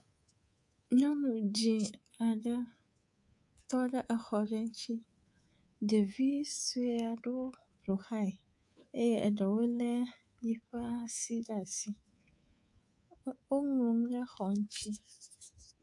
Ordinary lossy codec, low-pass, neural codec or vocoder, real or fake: AAC, 64 kbps; 10.8 kHz; codec, 24 kHz, 3.1 kbps, DualCodec; fake